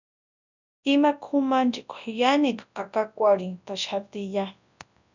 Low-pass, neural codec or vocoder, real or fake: 7.2 kHz; codec, 24 kHz, 0.9 kbps, WavTokenizer, large speech release; fake